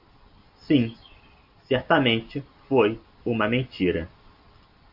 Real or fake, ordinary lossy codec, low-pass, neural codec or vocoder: real; AAC, 48 kbps; 5.4 kHz; none